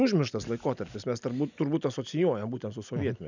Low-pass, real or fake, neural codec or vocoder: 7.2 kHz; real; none